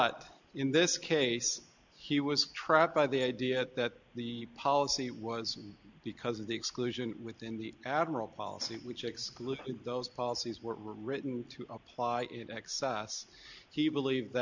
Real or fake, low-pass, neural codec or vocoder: real; 7.2 kHz; none